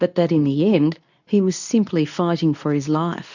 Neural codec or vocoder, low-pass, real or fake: codec, 24 kHz, 0.9 kbps, WavTokenizer, medium speech release version 2; 7.2 kHz; fake